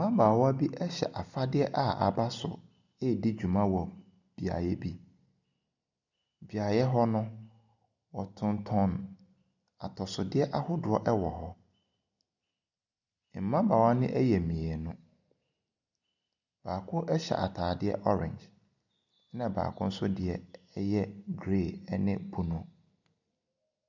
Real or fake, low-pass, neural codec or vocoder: real; 7.2 kHz; none